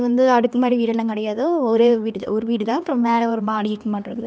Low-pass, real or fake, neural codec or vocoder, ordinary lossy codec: none; fake; codec, 16 kHz, 2 kbps, X-Codec, HuBERT features, trained on LibriSpeech; none